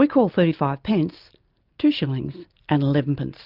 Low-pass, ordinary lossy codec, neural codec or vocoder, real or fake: 5.4 kHz; Opus, 24 kbps; none; real